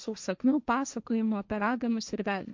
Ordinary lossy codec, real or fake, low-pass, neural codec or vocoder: MP3, 64 kbps; fake; 7.2 kHz; codec, 16 kHz, 1.1 kbps, Voila-Tokenizer